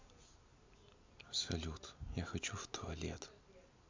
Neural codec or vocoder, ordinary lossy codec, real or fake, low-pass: none; MP3, 64 kbps; real; 7.2 kHz